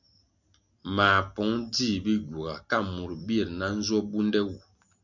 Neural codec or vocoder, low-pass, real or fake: none; 7.2 kHz; real